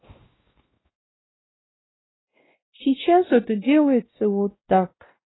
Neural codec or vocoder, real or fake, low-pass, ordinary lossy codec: codec, 16 kHz, 1 kbps, X-Codec, WavLM features, trained on Multilingual LibriSpeech; fake; 7.2 kHz; AAC, 16 kbps